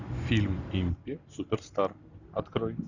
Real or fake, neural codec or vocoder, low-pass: real; none; 7.2 kHz